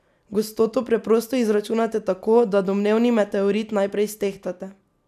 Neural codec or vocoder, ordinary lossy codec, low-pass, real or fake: none; none; 14.4 kHz; real